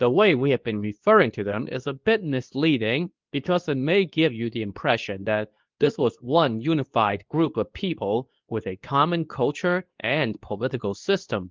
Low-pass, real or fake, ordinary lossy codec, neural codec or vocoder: 7.2 kHz; fake; Opus, 24 kbps; codec, 24 kHz, 0.9 kbps, WavTokenizer, medium speech release version 2